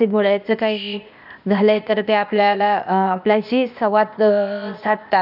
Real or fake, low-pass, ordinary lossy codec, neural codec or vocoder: fake; 5.4 kHz; none; codec, 16 kHz, 0.8 kbps, ZipCodec